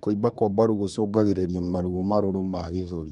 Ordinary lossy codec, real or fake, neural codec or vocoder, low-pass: none; fake; codec, 24 kHz, 1 kbps, SNAC; 10.8 kHz